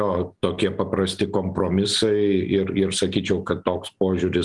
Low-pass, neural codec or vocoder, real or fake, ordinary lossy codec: 10.8 kHz; none; real; Opus, 24 kbps